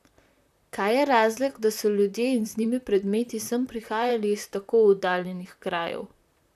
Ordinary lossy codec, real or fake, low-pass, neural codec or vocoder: none; fake; 14.4 kHz; vocoder, 44.1 kHz, 128 mel bands, Pupu-Vocoder